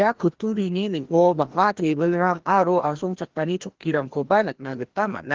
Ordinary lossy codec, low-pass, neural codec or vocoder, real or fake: Opus, 16 kbps; 7.2 kHz; codec, 16 kHz, 1 kbps, FreqCodec, larger model; fake